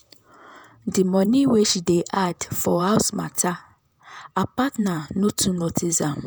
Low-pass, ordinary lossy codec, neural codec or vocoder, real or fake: none; none; vocoder, 48 kHz, 128 mel bands, Vocos; fake